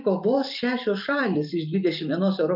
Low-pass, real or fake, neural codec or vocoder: 5.4 kHz; fake; vocoder, 44.1 kHz, 128 mel bands every 256 samples, BigVGAN v2